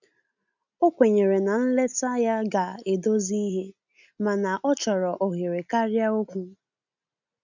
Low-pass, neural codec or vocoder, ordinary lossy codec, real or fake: 7.2 kHz; none; none; real